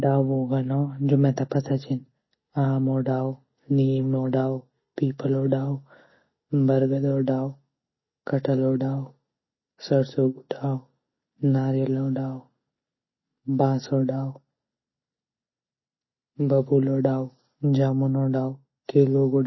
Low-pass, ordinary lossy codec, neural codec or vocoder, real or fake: 7.2 kHz; MP3, 24 kbps; none; real